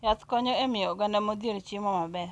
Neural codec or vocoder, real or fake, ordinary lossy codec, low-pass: none; real; none; none